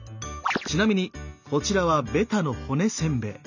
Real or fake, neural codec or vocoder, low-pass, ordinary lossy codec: real; none; 7.2 kHz; none